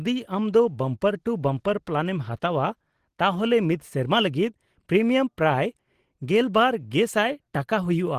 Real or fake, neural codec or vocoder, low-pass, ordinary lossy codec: real; none; 14.4 kHz; Opus, 16 kbps